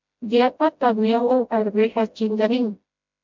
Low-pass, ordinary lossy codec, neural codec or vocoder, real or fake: 7.2 kHz; MP3, 64 kbps; codec, 16 kHz, 0.5 kbps, FreqCodec, smaller model; fake